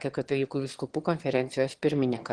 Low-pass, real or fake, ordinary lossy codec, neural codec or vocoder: 9.9 kHz; fake; Opus, 16 kbps; autoencoder, 22.05 kHz, a latent of 192 numbers a frame, VITS, trained on one speaker